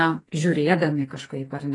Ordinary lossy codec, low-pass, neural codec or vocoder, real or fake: AAC, 32 kbps; 10.8 kHz; codec, 44.1 kHz, 2.6 kbps, SNAC; fake